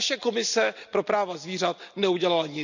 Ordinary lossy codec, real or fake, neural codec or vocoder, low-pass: none; real; none; 7.2 kHz